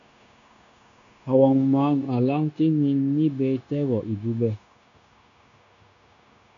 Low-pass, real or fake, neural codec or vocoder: 7.2 kHz; fake; codec, 16 kHz, 0.9 kbps, LongCat-Audio-Codec